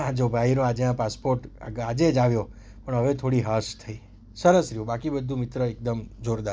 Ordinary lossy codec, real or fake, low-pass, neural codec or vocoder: none; real; none; none